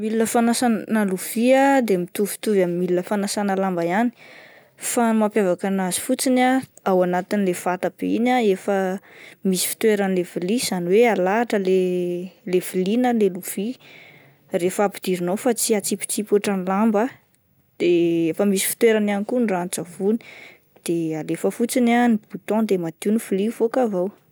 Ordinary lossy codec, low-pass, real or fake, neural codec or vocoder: none; none; real; none